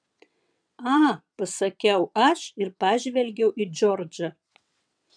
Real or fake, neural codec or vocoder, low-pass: fake; vocoder, 22.05 kHz, 80 mel bands, WaveNeXt; 9.9 kHz